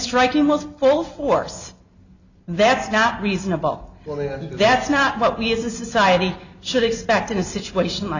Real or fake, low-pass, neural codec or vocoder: real; 7.2 kHz; none